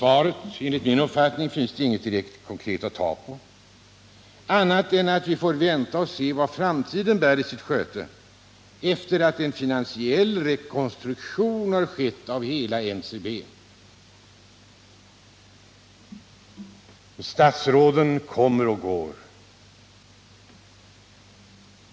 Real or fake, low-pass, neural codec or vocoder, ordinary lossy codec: real; none; none; none